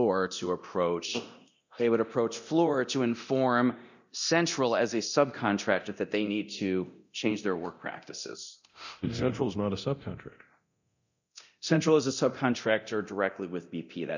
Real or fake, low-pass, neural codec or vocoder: fake; 7.2 kHz; codec, 24 kHz, 0.9 kbps, DualCodec